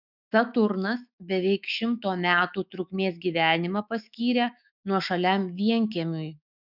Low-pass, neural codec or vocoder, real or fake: 5.4 kHz; autoencoder, 48 kHz, 128 numbers a frame, DAC-VAE, trained on Japanese speech; fake